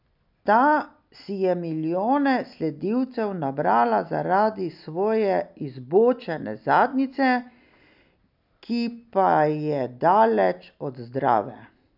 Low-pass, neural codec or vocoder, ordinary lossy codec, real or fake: 5.4 kHz; none; none; real